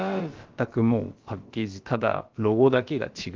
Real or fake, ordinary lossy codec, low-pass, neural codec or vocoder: fake; Opus, 32 kbps; 7.2 kHz; codec, 16 kHz, about 1 kbps, DyCAST, with the encoder's durations